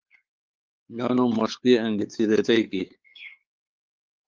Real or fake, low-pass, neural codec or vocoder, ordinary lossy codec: fake; 7.2 kHz; codec, 16 kHz, 4 kbps, X-Codec, WavLM features, trained on Multilingual LibriSpeech; Opus, 32 kbps